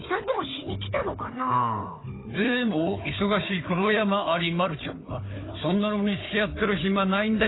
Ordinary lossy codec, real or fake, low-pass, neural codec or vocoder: AAC, 16 kbps; fake; 7.2 kHz; codec, 16 kHz, 4 kbps, FunCodec, trained on Chinese and English, 50 frames a second